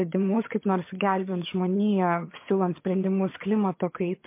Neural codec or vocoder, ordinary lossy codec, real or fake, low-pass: vocoder, 22.05 kHz, 80 mel bands, HiFi-GAN; MP3, 24 kbps; fake; 3.6 kHz